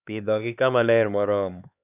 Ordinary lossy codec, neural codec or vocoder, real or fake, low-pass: none; codec, 16 kHz, 2 kbps, X-Codec, HuBERT features, trained on LibriSpeech; fake; 3.6 kHz